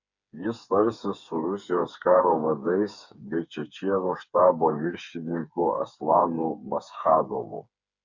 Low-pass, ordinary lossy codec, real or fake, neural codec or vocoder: 7.2 kHz; Opus, 64 kbps; fake; codec, 16 kHz, 4 kbps, FreqCodec, smaller model